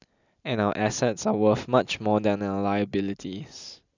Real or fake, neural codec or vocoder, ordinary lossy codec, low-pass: real; none; none; 7.2 kHz